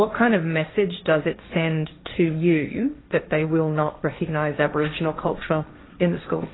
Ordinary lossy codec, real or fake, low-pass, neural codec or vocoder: AAC, 16 kbps; fake; 7.2 kHz; codec, 16 kHz, 1.1 kbps, Voila-Tokenizer